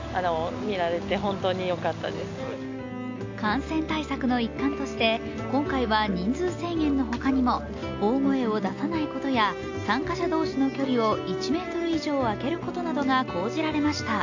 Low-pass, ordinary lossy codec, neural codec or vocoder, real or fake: 7.2 kHz; AAC, 48 kbps; none; real